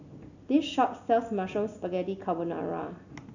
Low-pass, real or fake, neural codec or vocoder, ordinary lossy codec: 7.2 kHz; real; none; none